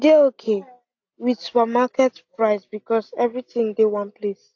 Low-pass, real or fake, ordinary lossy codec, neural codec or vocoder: 7.2 kHz; real; AAC, 48 kbps; none